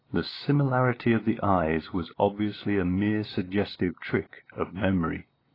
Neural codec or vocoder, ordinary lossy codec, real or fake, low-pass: none; AAC, 24 kbps; real; 5.4 kHz